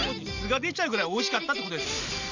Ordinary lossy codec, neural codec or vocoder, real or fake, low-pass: none; none; real; 7.2 kHz